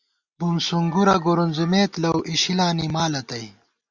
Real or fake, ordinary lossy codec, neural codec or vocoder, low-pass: real; Opus, 64 kbps; none; 7.2 kHz